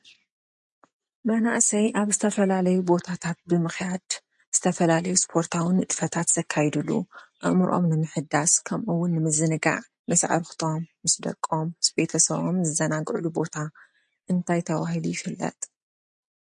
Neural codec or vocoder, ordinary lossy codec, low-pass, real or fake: none; MP3, 48 kbps; 10.8 kHz; real